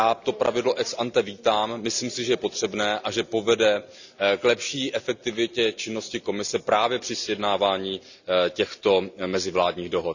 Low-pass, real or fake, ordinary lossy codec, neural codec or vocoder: 7.2 kHz; real; none; none